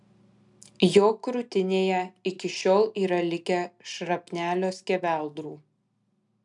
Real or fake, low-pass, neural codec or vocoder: real; 10.8 kHz; none